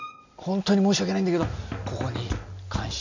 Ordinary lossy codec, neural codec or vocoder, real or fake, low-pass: none; none; real; 7.2 kHz